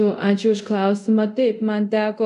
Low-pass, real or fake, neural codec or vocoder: 10.8 kHz; fake; codec, 24 kHz, 0.5 kbps, DualCodec